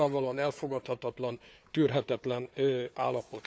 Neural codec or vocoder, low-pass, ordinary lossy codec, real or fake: codec, 16 kHz, 4 kbps, FunCodec, trained on Chinese and English, 50 frames a second; none; none; fake